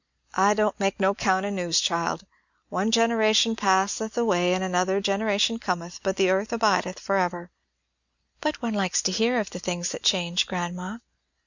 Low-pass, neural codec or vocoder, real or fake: 7.2 kHz; none; real